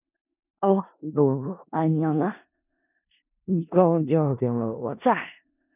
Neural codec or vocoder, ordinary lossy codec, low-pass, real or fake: codec, 16 kHz in and 24 kHz out, 0.4 kbps, LongCat-Audio-Codec, four codebook decoder; none; 3.6 kHz; fake